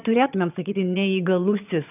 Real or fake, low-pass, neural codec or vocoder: fake; 3.6 kHz; vocoder, 22.05 kHz, 80 mel bands, HiFi-GAN